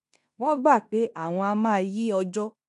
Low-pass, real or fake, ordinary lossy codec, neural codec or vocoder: 10.8 kHz; fake; AAC, 96 kbps; codec, 16 kHz in and 24 kHz out, 0.9 kbps, LongCat-Audio-Codec, fine tuned four codebook decoder